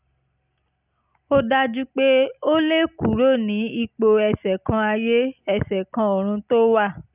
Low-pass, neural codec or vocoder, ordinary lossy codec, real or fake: 3.6 kHz; none; none; real